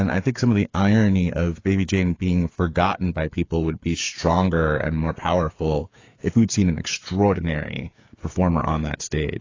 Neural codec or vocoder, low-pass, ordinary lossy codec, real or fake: codec, 16 kHz, 8 kbps, FreqCodec, smaller model; 7.2 kHz; AAC, 32 kbps; fake